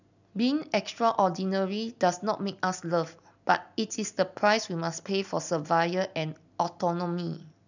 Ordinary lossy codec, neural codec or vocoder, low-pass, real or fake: none; none; 7.2 kHz; real